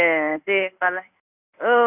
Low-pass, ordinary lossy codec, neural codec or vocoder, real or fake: 3.6 kHz; none; codec, 16 kHz in and 24 kHz out, 1 kbps, XY-Tokenizer; fake